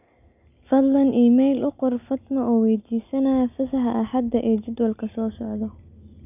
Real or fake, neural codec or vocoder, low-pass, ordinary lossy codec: real; none; 3.6 kHz; none